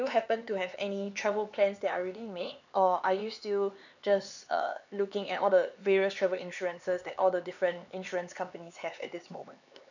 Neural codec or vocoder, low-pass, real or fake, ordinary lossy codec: codec, 16 kHz, 4 kbps, X-Codec, HuBERT features, trained on LibriSpeech; 7.2 kHz; fake; none